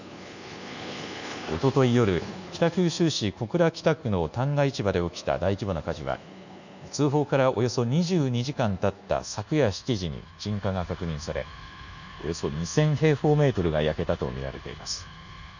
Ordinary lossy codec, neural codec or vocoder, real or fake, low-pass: none; codec, 24 kHz, 1.2 kbps, DualCodec; fake; 7.2 kHz